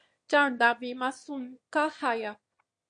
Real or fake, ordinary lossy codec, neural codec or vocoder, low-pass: fake; MP3, 48 kbps; autoencoder, 22.05 kHz, a latent of 192 numbers a frame, VITS, trained on one speaker; 9.9 kHz